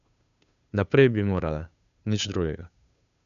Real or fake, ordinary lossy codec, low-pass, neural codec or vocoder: fake; none; 7.2 kHz; codec, 16 kHz, 2 kbps, FunCodec, trained on Chinese and English, 25 frames a second